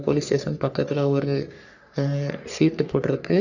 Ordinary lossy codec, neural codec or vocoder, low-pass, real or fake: none; codec, 44.1 kHz, 3.4 kbps, Pupu-Codec; 7.2 kHz; fake